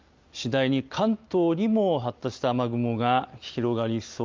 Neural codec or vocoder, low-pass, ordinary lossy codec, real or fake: none; 7.2 kHz; Opus, 32 kbps; real